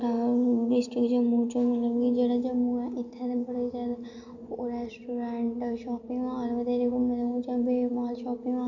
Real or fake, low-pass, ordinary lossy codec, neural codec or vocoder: real; 7.2 kHz; none; none